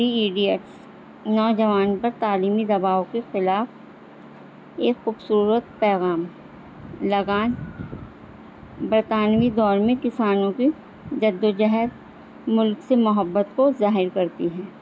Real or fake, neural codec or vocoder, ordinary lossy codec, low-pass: real; none; none; none